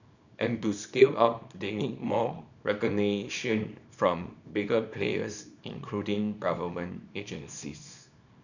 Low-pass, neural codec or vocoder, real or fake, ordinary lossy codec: 7.2 kHz; codec, 24 kHz, 0.9 kbps, WavTokenizer, small release; fake; none